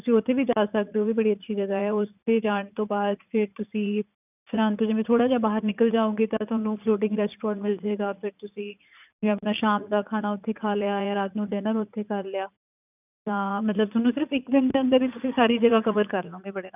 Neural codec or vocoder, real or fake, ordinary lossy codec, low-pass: vocoder, 44.1 kHz, 80 mel bands, Vocos; fake; none; 3.6 kHz